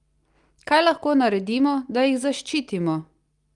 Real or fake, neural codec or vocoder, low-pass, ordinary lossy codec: real; none; 10.8 kHz; Opus, 32 kbps